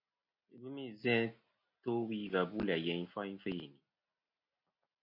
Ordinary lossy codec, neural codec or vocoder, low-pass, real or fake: MP3, 32 kbps; none; 5.4 kHz; real